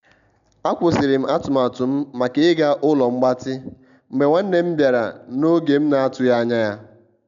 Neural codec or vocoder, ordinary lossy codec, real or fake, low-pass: none; none; real; 7.2 kHz